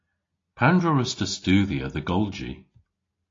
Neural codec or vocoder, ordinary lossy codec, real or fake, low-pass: none; AAC, 32 kbps; real; 7.2 kHz